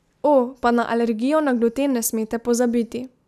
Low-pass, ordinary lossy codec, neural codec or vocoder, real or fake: 14.4 kHz; none; none; real